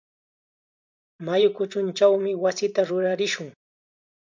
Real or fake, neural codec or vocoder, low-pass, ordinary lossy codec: fake; vocoder, 44.1 kHz, 128 mel bands every 512 samples, BigVGAN v2; 7.2 kHz; MP3, 64 kbps